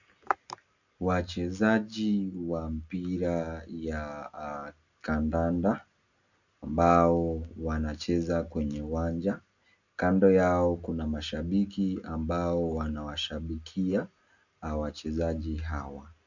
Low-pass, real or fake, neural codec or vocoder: 7.2 kHz; real; none